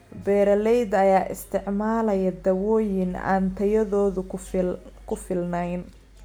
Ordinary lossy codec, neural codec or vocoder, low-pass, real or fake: none; none; none; real